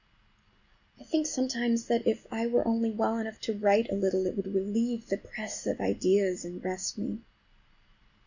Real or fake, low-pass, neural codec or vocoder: real; 7.2 kHz; none